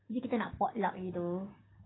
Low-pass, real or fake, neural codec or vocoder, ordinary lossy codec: 7.2 kHz; fake; codec, 16 kHz, 6 kbps, DAC; AAC, 16 kbps